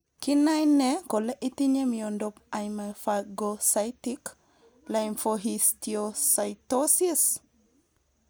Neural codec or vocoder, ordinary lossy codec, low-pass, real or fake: none; none; none; real